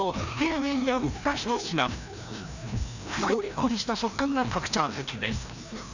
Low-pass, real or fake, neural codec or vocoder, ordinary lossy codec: 7.2 kHz; fake; codec, 16 kHz, 1 kbps, FreqCodec, larger model; none